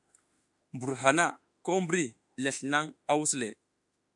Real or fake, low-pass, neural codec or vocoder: fake; 10.8 kHz; autoencoder, 48 kHz, 32 numbers a frame, DAC-VAE, trained on Japanese speech